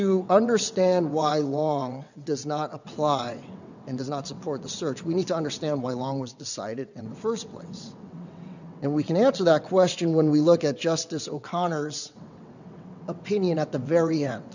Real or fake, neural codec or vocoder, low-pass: fake; vocoder, 22.05 kHz, 80 mel bands, WaveNeXt; 7.2 kHz